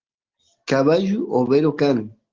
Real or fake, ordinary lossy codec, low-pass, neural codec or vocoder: real; Opus, 16 kbps; 7.2 kHz; none